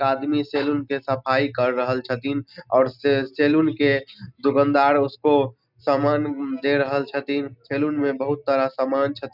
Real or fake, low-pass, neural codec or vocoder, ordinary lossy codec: real; 5.4 kHz; none; none